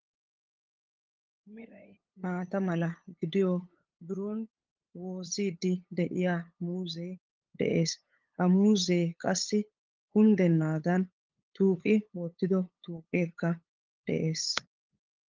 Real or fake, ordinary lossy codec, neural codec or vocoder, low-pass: fake; Opus, 32 kbps; codec, 16 kHz, 8 kbps, FunCodec, trained on LibriTTS, 25 frames a second; 7.2 kHz